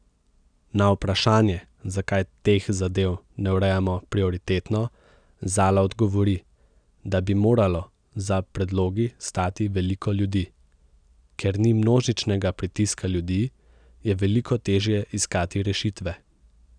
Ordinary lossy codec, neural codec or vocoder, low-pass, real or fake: none; none; 9.9 kHz; real